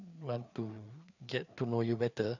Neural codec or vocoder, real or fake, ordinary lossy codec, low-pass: codec, 16 kHz, 4 kbps, FreqCodec, larger model; fake; none; 7.2 kHz